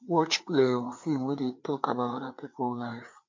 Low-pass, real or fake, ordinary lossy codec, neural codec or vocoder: 7.2 kHz; fake; MP3, 48 kbps; codec, 16 kHz, 4 kbps, FreqCodec, larger model